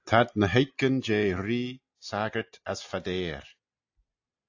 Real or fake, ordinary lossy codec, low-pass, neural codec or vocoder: real; AAC, 48 kbps; 7.2 kHz; none